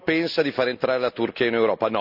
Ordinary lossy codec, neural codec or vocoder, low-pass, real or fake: none; none; 5.4 kHz; real